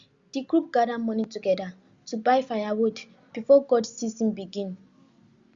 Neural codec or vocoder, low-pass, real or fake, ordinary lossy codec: none; 7.2 kHz; real; Opus, 64 kbps